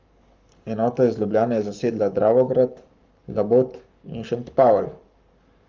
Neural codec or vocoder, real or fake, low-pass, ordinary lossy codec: codec, 44.1 kHz, 7.8 kbps, Pupu-Codec; fake; 7.2 kHz; Opus, 32 kbps